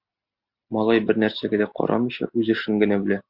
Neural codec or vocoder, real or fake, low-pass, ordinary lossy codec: none; real; 5.4 kHz; Opus, 64 kbps